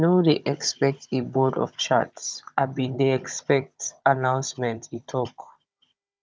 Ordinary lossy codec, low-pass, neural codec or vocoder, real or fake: none; none; codec, 16 kHz, 16 kbps, FunCodec, trained on Chinese and English, 50 frames a second; fake